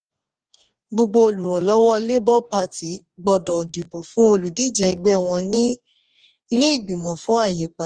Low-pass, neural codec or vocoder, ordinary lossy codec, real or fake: 9.9 kHz; codec, 44.1 kHz, 2.6 kbps, DAC; Opus, 24 kbps; fake